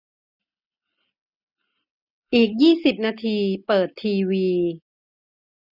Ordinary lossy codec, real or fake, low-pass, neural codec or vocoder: none; real; 5.4 kHz; none